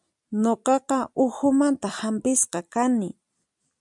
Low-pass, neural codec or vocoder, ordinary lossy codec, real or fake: 10.8 kHz; vocoder, 44.1 kHz, 128 mel bands every 256 samples, BigVGAN v2; MP3, 96 kbps; fake